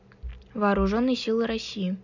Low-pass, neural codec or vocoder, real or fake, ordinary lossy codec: 7.2 kHz; none; real; none